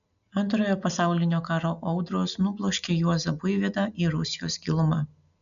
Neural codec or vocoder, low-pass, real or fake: none; 7.2 kHz; real